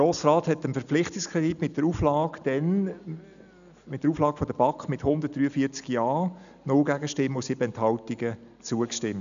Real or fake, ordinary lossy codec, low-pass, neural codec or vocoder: real; none; 7.2 kHz; none